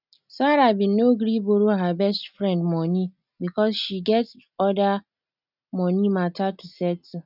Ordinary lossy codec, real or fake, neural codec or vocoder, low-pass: none; real; none; 5.4 kHz